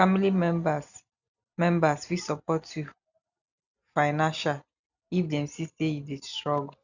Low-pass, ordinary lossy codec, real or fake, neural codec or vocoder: 7.2 kHz; none; real; none